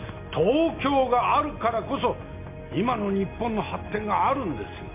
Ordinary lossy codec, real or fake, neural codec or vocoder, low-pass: MP3, 24 kbps; real; none; 3.6 kHz